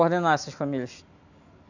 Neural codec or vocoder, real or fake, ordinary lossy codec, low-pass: none; real; none; 7.2 kHz